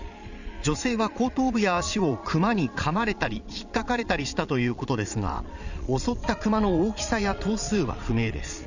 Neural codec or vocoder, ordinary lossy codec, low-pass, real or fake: vocoder, 22.05 kHz, 80 mel bands, Vocos; none; 7.2 kHz; fake